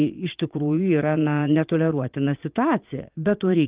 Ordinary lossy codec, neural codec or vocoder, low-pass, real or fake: Opus, 32 kbps; vocoder, 22.05 kHz, 80 mel bands, Vocos; 3.6 kHz; fake